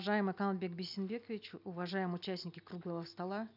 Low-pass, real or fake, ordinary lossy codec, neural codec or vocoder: 5.4 kHz; real; none; none